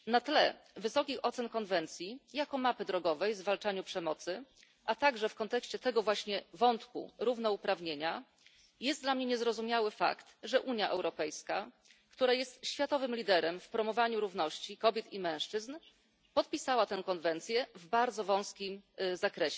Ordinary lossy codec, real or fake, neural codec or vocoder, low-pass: none; real; none; none